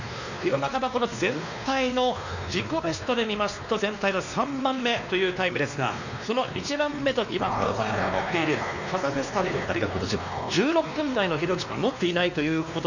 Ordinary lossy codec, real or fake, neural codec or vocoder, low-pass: none; fake; codec, 16 kHz, 2 kbps, X-Codec, WavLM features, trained on Multilingual LibriSpeech; 7.2 kHz